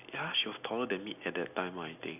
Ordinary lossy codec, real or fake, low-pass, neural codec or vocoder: none; real; 3.6 kHz; none